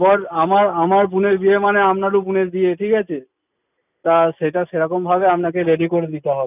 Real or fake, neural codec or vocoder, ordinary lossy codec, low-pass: real; none; none; 3.6 kHz